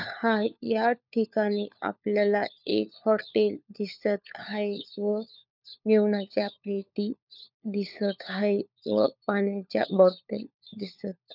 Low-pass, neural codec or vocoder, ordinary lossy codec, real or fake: 5.4 kHz; codec, 16 kHz, 4 kbps, FunCodec, trained on LibriTTS, 50 frames a second; none; fake